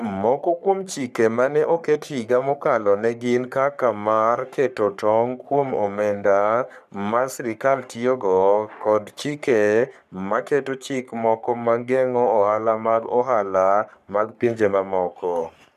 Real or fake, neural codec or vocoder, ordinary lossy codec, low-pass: fake; codec, 44.1 kHz, 3.4 kbps, Pupu-Codec; none; 14.4 kHz